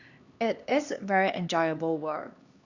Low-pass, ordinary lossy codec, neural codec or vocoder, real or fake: 7.2 kHz; Opus, 64 kbps; codec, 16 kHz, 2 kbps, X-Codec, HuBERT features, trained on LibriSpeech; fake